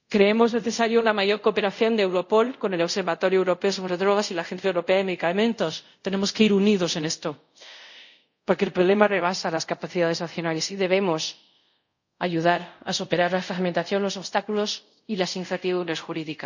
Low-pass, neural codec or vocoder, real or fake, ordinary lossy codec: 7.2 kHz; codec, 24 kHz, 0.5 kbps, DualCodec; fake; none